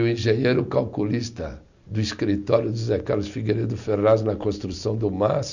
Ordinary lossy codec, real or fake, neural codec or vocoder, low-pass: none; real; none; 7.2 kHz